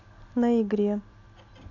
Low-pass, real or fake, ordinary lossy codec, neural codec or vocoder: 7.2 kHz; real; none; none